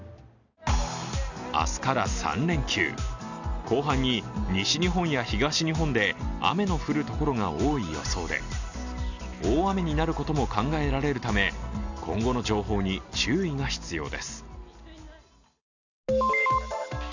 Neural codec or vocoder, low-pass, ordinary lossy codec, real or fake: none; 7.2 kHz; none; real